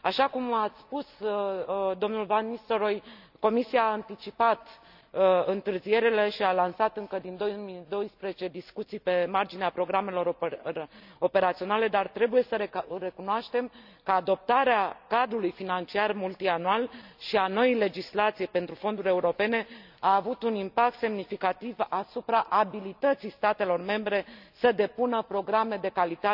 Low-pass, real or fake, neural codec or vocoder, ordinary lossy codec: 5.4 kHz; real; none; none